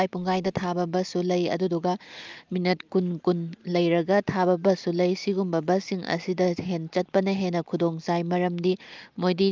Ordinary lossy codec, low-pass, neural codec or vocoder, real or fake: Opus, 24 kbps; 7.2 kHz; none; real